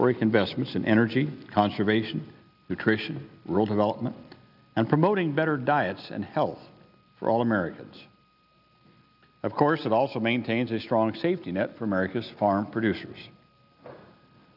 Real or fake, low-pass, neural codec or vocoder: real; 5.4 kHz; none